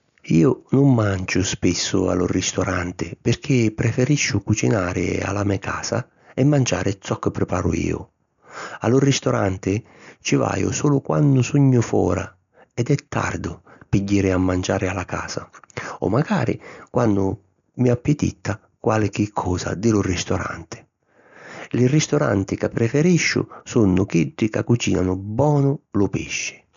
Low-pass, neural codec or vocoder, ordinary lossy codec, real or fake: 7.2 kHz; none; none; real